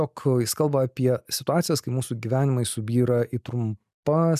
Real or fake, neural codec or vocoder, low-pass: real; none; 14.4 kHz